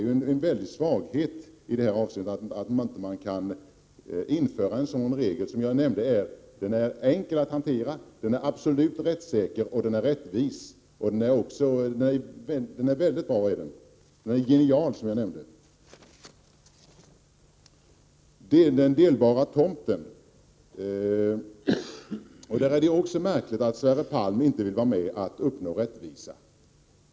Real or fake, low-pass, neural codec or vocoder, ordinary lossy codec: real; none; none; none